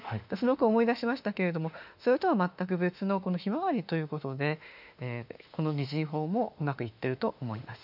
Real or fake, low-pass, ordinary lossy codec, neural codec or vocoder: fake; 5.4 kHz; none; autoencoder, 48 kHz, 32 numbers a frame, DAC-VAE, trained on Japanese speech